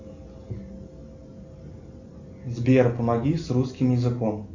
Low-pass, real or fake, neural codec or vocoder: 7.2 kHz; real; none